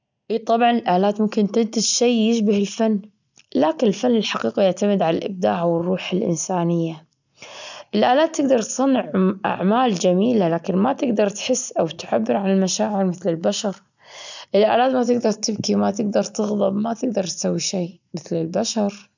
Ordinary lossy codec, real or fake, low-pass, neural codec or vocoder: none; real; 7.2 kHz; none